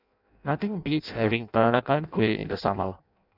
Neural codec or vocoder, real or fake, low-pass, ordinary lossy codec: codec, 16 kHz in and 24 kHz out, 0.6 kbps, FireRedTTS-2 codec; fake; 5.4 kHz; none